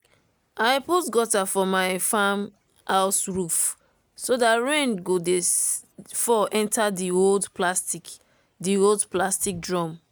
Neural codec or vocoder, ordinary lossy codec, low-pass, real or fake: none; none; none; real